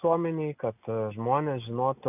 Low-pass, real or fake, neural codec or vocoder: 3.6 kHz; real; none